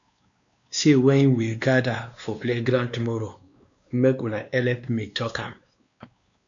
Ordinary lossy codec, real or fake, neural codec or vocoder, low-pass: MP3, 64 kbps; fake; codec, 16 kHz, 2 kbps, X-Codec, WavLM features, trained on Multilingual LibriSpeech; 7.2 kHz